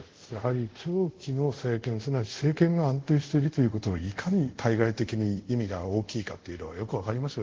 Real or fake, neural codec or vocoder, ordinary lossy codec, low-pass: fake; codec, 24 kHz, 0.5 kbps, DualCodec; Opus, 16 kbps; 7.2 kHz